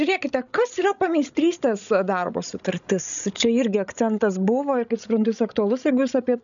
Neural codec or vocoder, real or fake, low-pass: codec, 16 kHz, 16 kbps, FreqCodec, larger model; fake; 7.2 kHz